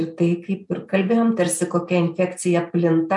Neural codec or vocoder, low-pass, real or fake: none; 10.8 kHz; real